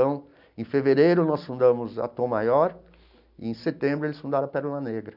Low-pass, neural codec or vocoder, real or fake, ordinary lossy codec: 5.4 kHz; none; real; none